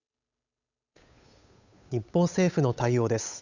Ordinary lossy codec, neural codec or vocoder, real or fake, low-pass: none; codec, 16 kHz, 8 kbps, FunCodec, trained on Chinese and English, 25 frames a second; fake; 7.2 kHz